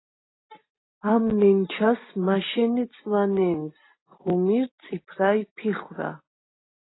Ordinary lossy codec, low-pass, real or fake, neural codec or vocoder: AAC, 16 kbps; 7.2 kHz; real; none